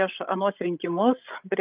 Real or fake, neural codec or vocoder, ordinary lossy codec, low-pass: fake; codec, 16 kHz, 16 kbps, FreqCodec, larger model; Opus, 24 kbps; 3.6 kHz